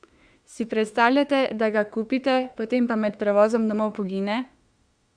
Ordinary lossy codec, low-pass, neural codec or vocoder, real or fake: Opus, 64 kbps; 9.9 kHz; autoencoder, 48 kHz, 32 numbers a frame, DAC-VAE, trained on Japanese speech; fake